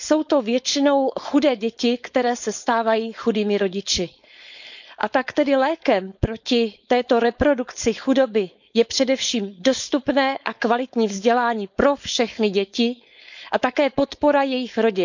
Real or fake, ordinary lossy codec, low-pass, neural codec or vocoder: fake; none; 7.2 kHz; codec, 16 kHz, 4.8 kbps, FACodec